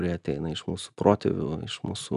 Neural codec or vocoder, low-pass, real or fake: none; 10.8 kHz; real